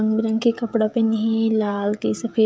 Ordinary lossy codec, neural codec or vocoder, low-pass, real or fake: none; codec, 16 kHz, 16 kbps, FreqCodec, smaller model; none; fake